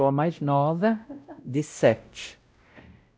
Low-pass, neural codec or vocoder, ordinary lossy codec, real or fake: none; codec, 16 kHz, 0.5 kbps, X-Codec, WavLM features, trained on Multilingual LibriSpeech; none; fake